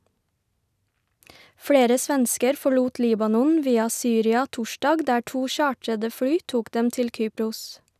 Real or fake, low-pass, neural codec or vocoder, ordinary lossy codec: real; 14.4 kHz; none; none